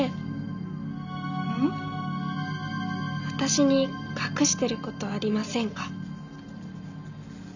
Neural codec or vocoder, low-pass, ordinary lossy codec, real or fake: none; 7.2 kHz; none; real